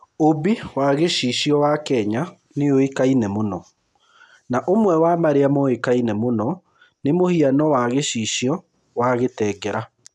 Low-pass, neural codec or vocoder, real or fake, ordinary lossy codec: none; none; real; none